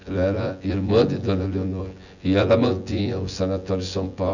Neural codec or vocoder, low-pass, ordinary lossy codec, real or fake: vocoder, 24 kHz, 100 mel bands, Vocos; 7.2 kHz; none; fake